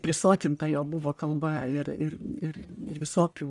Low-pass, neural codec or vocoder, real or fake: 10.8 kHz; codec, 44.1 kHz, 1.7 kbps, Pupu-Codec; fake